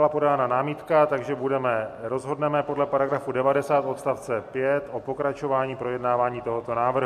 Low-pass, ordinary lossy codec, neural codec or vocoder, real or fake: 14.4 kHz; MP3, 64 kbps; none; real